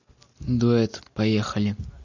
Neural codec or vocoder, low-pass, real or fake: none; 7.2 kHz; real